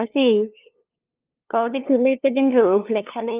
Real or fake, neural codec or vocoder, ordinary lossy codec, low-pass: fake; codec, 16 kHz, 2 kbps, FunCodec, trained on LibriTTS, 25 frames a second; Opus, 24 kbps; 3.6 kHz